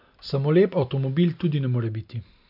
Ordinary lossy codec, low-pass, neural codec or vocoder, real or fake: none; 5.4 kHz; none; real